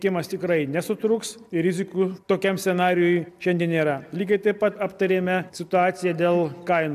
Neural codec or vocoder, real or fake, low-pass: none; real; 14.4 kHz